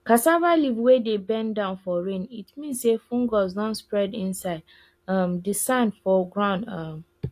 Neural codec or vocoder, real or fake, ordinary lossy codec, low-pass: none; real; AAC, 64 kbps; 14.4 kHz